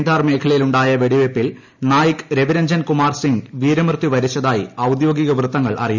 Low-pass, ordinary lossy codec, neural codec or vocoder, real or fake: 7.2 kHz; none; none; real